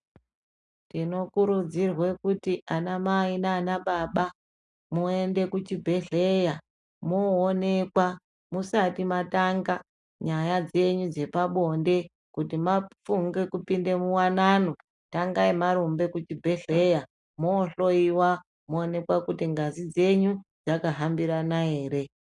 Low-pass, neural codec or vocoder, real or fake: 10.8 kHz; none; real